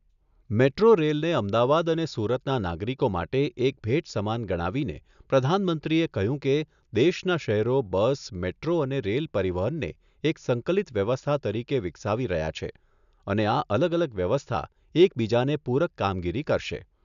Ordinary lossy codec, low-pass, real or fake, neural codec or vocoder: none; 7.2 kHz; real; none